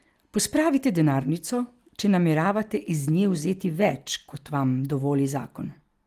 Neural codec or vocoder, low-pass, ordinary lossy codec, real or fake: none; 14.4 kHz; Opus, 24 kbps; real